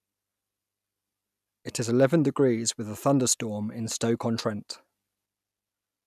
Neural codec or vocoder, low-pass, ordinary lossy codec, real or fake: none; 14.4 kHz; none; real